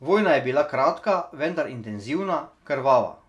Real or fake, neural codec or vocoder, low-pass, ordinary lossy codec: real; none; none; none